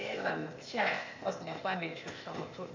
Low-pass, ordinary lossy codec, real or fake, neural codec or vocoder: 7.2 kHz; MP3, 64 kbps; fake; codec, 16 kHz, 0.8 kbps, ZipCodec